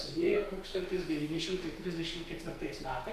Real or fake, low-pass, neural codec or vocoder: fake; 14.4 kHz; codec, 44.1 kHz, 2.6 kbps, SNAC